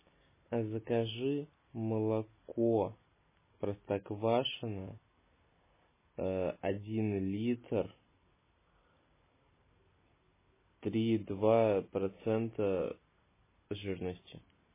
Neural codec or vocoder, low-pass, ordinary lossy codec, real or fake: none; 3.6 kHz; MP3, 16 kbps; real